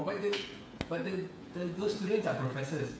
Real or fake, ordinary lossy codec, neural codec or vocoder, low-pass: fake; none; codec, 16 kHz, 8 kbps, FreqCodec, smaller model; none